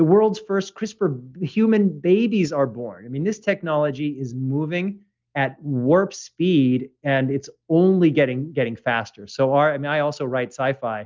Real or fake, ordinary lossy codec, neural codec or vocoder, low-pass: real; Opus, 24 kbps; none; 7.2 kHz